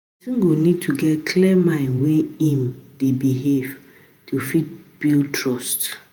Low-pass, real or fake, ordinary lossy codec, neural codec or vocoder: none; fake; none; vocoder, 48 kHz, 128 mel bands, Vocos